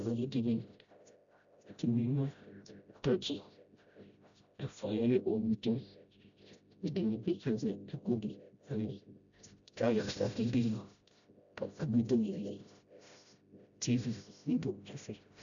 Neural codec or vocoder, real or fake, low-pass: codec, 16 kHz, 0.5 kbps, FreqCodec, smaller model; fake; 7.2 kHz